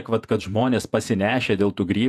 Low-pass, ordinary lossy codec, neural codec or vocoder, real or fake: 14.4 kHz; AAC, 96 kbps; vocoder, 48 kHz, 128 mel bands, Vocos; fake